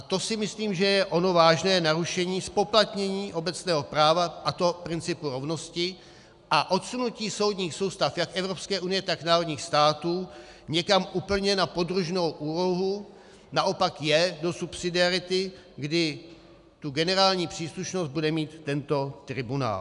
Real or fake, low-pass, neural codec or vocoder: real; 10.8 kHz; none